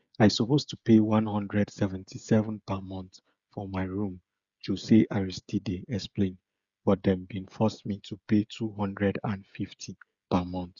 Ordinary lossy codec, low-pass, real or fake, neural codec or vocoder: Opus, 64 kbps; 7.2 kHz; fake; codec, 16 kHz, 16 kbps, FreqCodec, smaller model